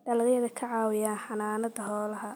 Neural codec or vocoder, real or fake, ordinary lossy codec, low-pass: none; real; none; none